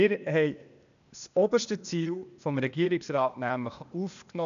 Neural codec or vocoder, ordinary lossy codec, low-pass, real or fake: codec, 16 kHz, 0.8 kbps, ZipCodec; none; 7.2 kHz; fake